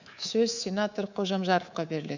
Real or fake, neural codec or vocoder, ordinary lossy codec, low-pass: fake; codec, 24 kHz, 3.1 kbps, DualCodec; none; 7.2 kHz